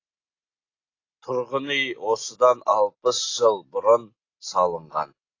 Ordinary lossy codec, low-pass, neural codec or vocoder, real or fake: AAC, 32 kbps; 7.2 kHz; none; real